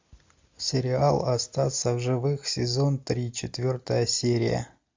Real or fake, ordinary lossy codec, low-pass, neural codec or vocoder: real; MP3, 64 kbps; 7.2 kHz; none